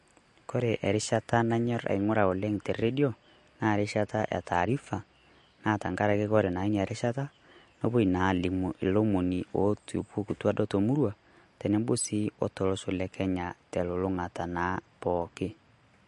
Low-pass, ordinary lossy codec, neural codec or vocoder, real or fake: 14.4 kHz; MP3, 48 kbps; none; real